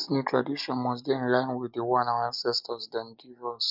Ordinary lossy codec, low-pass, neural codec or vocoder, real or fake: none; 5.4 kHz; none; real